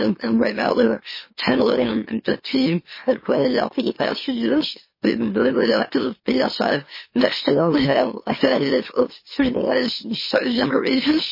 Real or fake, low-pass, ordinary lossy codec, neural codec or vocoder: fake; 5.4 kHz; MP3, 24 kbps; autoencoder, 44.1 kHz, a latent of 192 numbers a frame, MeloTTS